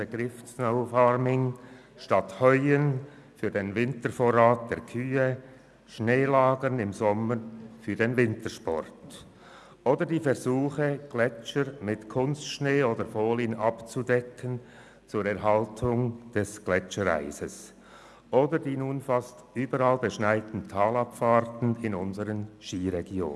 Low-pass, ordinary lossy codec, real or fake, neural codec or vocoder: none; none; real; none